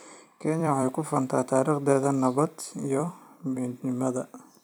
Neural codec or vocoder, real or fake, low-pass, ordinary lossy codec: vocoder, 44.1 kHz, 128 mel bands every 256 samples, BigVGAN v2; fake; none; none